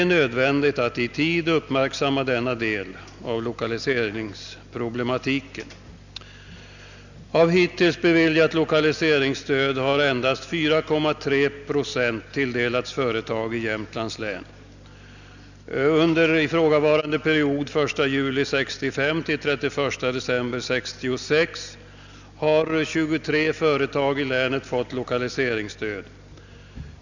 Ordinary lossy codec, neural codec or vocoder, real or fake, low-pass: none; none; real; 7.2 kHz